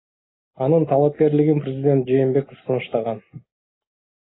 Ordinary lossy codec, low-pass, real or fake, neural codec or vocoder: AAC, 16 kbps; 7.2 kHz; real; none